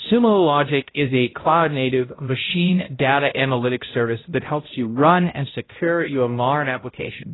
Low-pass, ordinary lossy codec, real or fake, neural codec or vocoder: 7.2 kHz; AAC, 16 kbps; fake; codec, 16 kHz, 0.5 kbps, X-Codec, HuBERT features, trained on balanced general audio